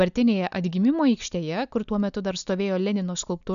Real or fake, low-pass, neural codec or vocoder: real; 7.2 kHz; none